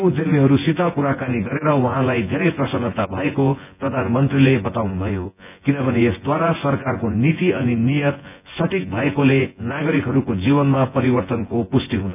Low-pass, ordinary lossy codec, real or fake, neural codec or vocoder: 3.6 kHz; none; fake; vocoder, 24 kHz, 100 mel bands, Vocos